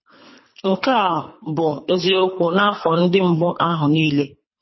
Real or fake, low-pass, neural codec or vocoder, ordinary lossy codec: fake; 7.2 kHz; codec, 24 kHz, 3 kbps, HILCodec; MP3, 24 kbps